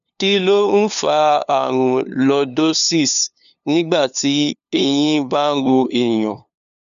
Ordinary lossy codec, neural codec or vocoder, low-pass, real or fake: none; codec, 16 kHz, 2 kbps, FunCodec, trained on LibriTTS, 25 frames a second; 7.2 kHz; fake